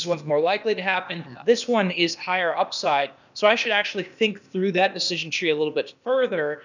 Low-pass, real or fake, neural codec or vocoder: 7.2 kHz; fake; codec, 16 kHz, 0.8 kbps, ZipCodec